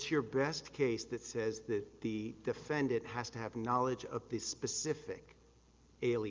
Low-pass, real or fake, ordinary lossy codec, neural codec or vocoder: 7.2 kHz; real; Opus, 32 kbps; none